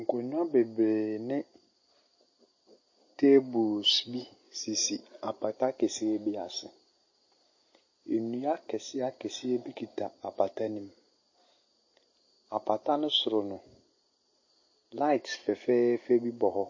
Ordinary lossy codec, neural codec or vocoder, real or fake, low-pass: MP3, 32 kbps; none; real; 7.2 kHz